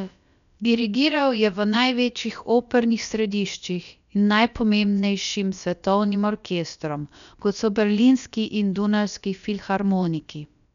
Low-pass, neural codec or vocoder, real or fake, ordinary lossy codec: 7.2 kHz; codec, 16 kHz, about 1 kbps, DyCAST, with the encoder's durations; fake; none